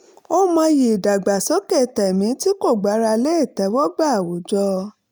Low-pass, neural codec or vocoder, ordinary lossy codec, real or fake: none; none; none; real